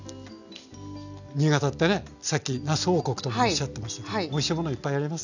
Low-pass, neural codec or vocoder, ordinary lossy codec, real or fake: 7.2 kHz; none; none; real